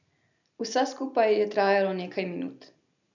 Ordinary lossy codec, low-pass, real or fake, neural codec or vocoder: none; 7.2 kHz; real; none